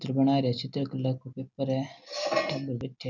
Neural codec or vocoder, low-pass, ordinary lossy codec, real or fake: none; 7.2 kHz; none; real